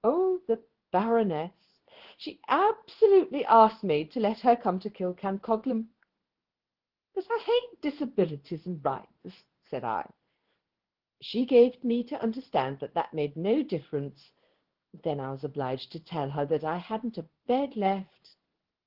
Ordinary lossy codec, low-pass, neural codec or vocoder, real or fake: Opus, 16 kbps; 5.4 kHz; codec, 16 kHz in and 24 kHz out, 1 kbps, XY-Tokenizer; fake